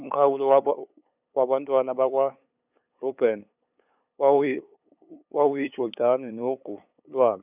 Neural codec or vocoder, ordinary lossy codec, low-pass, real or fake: codec, 16 kHz, 8 kbps, FunCodec, trained on LibriTTS, 25 frames a second; none; 3.6 kHz; fake